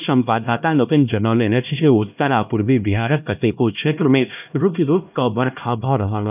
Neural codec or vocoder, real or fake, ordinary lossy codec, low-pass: codec, 16 kHz, 1 kbps, X-Codec, HuBERT features, trained on LibriSpeech; fake; none; 3.6 kHz